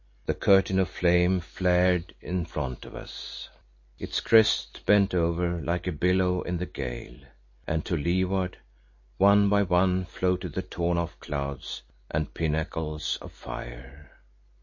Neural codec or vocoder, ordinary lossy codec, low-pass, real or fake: none; MP3, 32 kbps; 7.2 kHz; real